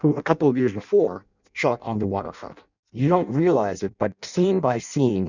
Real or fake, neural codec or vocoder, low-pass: fake; codec, 16 kHz in and 24 kHz out, 0.6 kbps, FireRedTTS-2 codec; 7.2 kHz